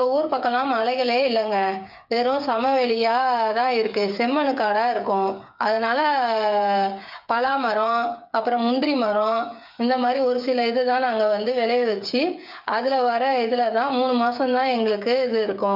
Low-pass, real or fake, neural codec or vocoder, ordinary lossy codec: 5.4 kHz; fake; codec, 16 kHz, 8 kbps, FreqCodec, smaller model; none